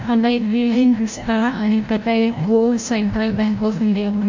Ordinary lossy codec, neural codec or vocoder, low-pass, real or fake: MP3, 48 kbps; codec, 16 kHz, 0.5 kbps, FreqCodec, larger model; 7.2 kHz; fake